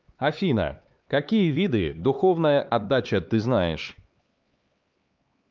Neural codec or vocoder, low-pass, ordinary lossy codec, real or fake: codec, 16 kHz, 4 kbps, X-Codec, HuBERT features, trained on LibriSpeech; 7.2 kHz; Opus, 24 kbps; fake